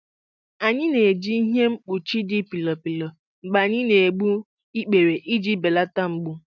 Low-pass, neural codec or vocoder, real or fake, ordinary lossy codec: 7.2 kHz; none; real; none